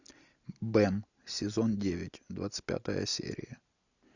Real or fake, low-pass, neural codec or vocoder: real; 7.2 kHz; none